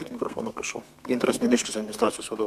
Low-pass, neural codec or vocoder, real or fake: 14.4 kHz; codec, 32 kHz, 1.9 kbps, SNAC; fake